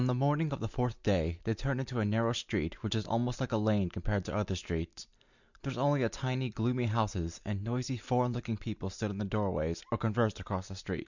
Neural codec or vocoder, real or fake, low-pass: none; real; 7.2 kHz